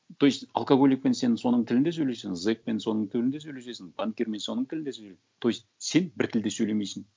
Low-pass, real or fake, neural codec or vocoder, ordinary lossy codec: none; real; none; none